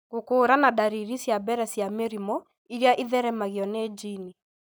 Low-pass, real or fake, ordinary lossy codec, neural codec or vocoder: none; real; none; none